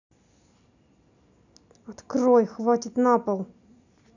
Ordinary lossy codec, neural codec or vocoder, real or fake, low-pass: none; none; real; 7.2 kHz